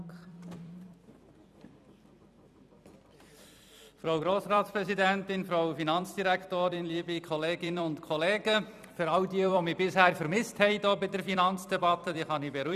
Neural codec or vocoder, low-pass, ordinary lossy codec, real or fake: vocoder, 44.1 kHz, 128 mel bands every 256 samples, BigVGAN v2; 14.4 kHz; none; fake